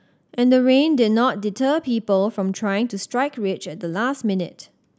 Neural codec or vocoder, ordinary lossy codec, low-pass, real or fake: none; none; none; real